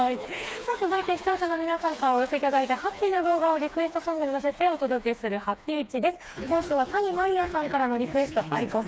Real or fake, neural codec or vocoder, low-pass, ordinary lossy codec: fake; codec, 16 kHz, 2 kbps, FreqCodec, smaller model; none; none